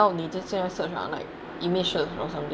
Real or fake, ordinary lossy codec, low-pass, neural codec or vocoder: real; none; none; none